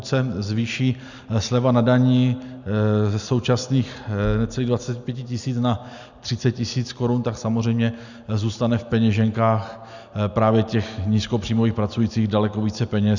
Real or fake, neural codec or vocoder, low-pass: real; none; 7.2 kHz